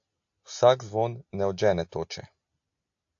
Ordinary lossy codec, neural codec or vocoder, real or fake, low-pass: AAC, 48 kbps; none; real; 7.2 kHz